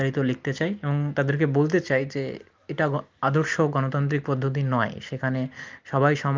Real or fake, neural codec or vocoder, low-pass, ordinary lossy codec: real; none; 7.2 kHz; Opus, 24 kbps